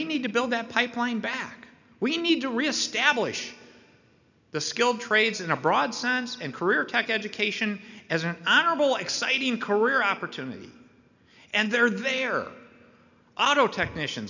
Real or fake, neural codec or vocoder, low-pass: real; none; 7.2 kHz